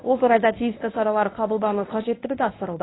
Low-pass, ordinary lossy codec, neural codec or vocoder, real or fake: 7.2 kHz; AAC, 16 kbps; codec, 24 kHz, 0.9 kbps, WavTokenizer, medium speech release version 1; fake